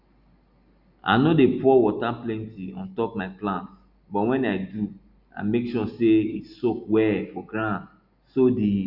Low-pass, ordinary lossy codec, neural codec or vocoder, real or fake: 5.4 kHz; Opus, 64 kbps; none; real